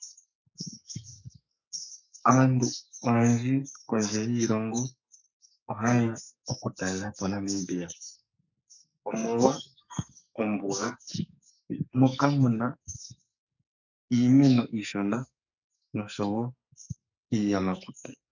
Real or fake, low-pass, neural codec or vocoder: fake; 7.2 kHz; codec, 44.1 kHz, 2.6 kbps, SNAC